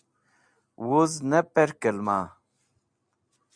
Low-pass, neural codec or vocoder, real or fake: 9.9 kHz; none; real